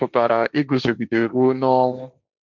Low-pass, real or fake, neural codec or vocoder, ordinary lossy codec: 7.2 kHz; fake; codec, 16 kHz, 1.1 kbps, Voila-Tokenizer; none